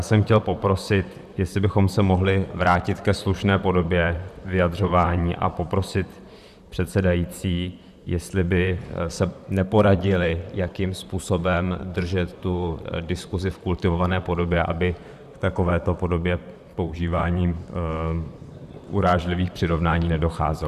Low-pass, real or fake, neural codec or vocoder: 14.4 kHz; fake; vocoder, 44.1 kHz, 128 mel bands, Pupu-Vocoder